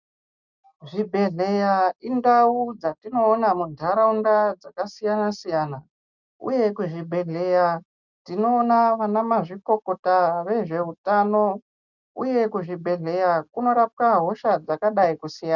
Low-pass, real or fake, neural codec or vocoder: 7.2 kHz; real; none